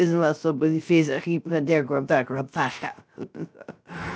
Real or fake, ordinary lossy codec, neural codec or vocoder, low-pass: fake; none; codec, 16 kHz, 0.3 kbps, FocalCodec; none